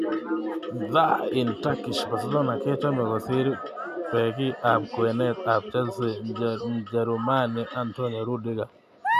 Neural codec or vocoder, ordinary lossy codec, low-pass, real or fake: none; none; 14.4 kHz; real